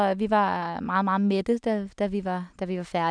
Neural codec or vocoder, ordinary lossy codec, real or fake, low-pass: none; none; real; 9.9 kHz